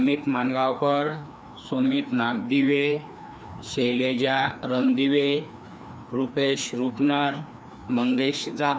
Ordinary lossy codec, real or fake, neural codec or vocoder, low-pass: none; fake; codec, 16 kHz, 2 kbps, FreqCodec, larger model; none